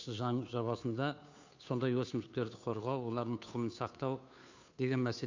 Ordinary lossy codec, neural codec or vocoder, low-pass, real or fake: AAC, 48 kbps; codec, 16 kHz, 2 kbps, FunCodec, trained on Chinese and English, 25 frames a second; 7.2 kHz; fake